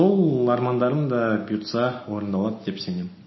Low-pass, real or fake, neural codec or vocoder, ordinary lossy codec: 7.2 kHz; real; none; MP3, 24 kbps